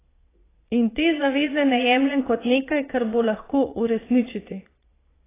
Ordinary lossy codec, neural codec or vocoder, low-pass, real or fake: AAC, 16 kbps; vocoder, 22.05 kHz, 80 mel bands, Vocos; 3.6 kHz; fake